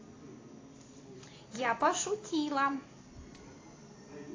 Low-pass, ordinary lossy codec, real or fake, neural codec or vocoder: 7.2 kHz; AAC, 32 kbps; real; none